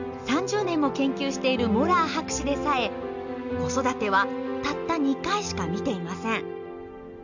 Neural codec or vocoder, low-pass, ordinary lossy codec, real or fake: none; 7.2 kHz; none; real